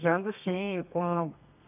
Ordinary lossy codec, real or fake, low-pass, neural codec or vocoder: none; fake; 3.6 kHz; codec, 32 kHz, 1.9 kbps, SNAC